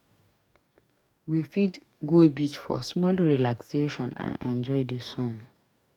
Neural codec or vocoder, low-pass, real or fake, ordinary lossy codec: codec, 44.1 kHz, 2.6 kbps, DAC; 19.8 kHz; fake; none